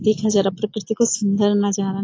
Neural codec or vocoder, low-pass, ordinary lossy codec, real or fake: none; 7.2 kHz; AAC, 32 kbps; real